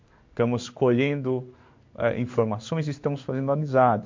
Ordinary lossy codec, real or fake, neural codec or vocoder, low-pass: MP3, 48 kbps; fake; codec, 16 kHz in and 24 kHz out, 1 kbps, XY-Tokenizer; 7.2 kHz